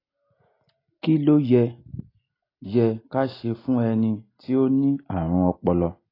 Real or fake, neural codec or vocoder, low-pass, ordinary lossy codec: real; none; 5.4 kHz; AAC, 24 kbps